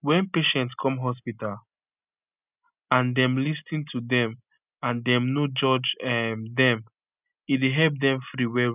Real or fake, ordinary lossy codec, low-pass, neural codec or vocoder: real; none; 3.6 kHz; none